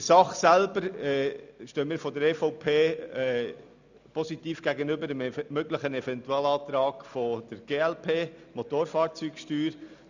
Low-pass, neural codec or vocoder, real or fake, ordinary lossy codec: 7.2 kHz; none; real; none